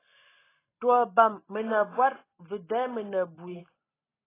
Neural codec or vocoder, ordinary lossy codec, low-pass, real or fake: none; AAC, 16 kbps; 3.6 kHz; real